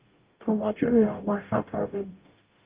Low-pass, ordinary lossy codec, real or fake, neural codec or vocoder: 3.6 kHz; Opus, 24 kbps; fake; codec, 44.1 kHz, 0.9 kbps, DAC